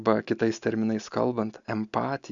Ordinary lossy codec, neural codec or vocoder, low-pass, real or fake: Opus, 64 kbps; none; 7.2 kHz; real